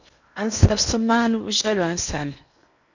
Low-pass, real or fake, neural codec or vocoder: 7.2 kHz; fake; codec, 16 kHz in and 24 kHz out, 0.8 kbps, FocalCodec, streaming, 65536 codes